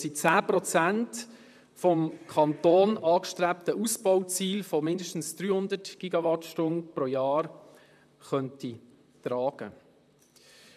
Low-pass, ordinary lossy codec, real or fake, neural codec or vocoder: 14.4 kHz; none; fake; vocoder, 44.1 kHz, 128 mel bands, Pupu-Vocoder